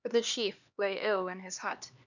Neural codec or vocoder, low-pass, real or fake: codec, 16 kHz, 2 kbps, X-Codec, HuBERT features, trained on LibriSpeech; 7.2 kHz; fake